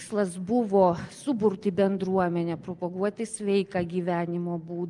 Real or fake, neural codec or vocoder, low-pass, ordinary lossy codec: real; none; 10.8 kHz; Opus, 32 kbps